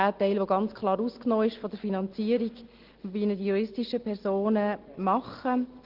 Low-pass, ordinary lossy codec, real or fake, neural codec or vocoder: 5.4 kHz; Opus, 16 kbps; real; none